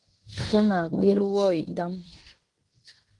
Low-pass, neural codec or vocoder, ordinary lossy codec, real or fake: 10.8 kHz; codec, 16 kHz in and 24 kHz out, 0.9 kbps, LongCat-Audio-Codec, fine tuned four codebook decoder; Opus, 24 kbps; fake